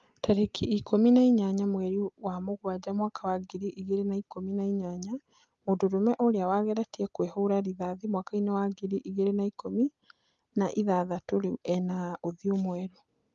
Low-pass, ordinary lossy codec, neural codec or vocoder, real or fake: 7.2 kHz; Opus, 32 kbps; none; real